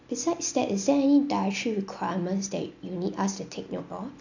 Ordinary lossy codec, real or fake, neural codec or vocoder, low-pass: none; real; none; 7.2 kHz